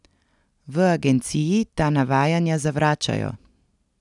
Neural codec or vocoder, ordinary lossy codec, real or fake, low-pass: none; none; real; 10.8 kHz